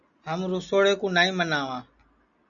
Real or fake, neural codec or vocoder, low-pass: real; none; 7.2 kHz